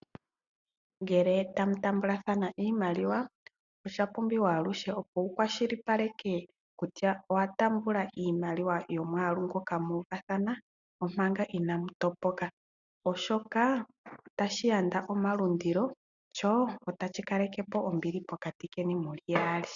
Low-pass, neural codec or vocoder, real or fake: 7.2 kHz; none; real